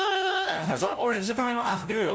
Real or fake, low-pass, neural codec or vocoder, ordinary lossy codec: fake; none; codec, 16 kHz, 0.5 kbps, FunCodec, trained on LibriTTS, 25 frames a second; none